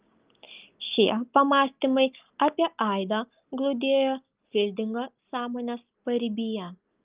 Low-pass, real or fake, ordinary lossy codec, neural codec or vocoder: 3.6 kHz; real; Opus, 32 kbps; none